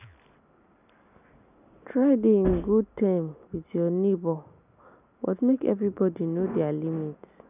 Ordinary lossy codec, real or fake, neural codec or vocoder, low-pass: none; real; none; 3.6 kHz